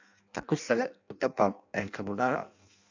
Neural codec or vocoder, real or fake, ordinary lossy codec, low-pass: codec, 16 kHz in and 24 kHz out, 0.6 kbps, FireRedTTS-2 codec; fake; AAC, 48 kbps; 7.2 kHz